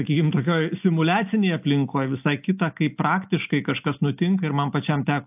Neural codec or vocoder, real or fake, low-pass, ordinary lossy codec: none; real; 3.6 kHz; AAC, 32 kbps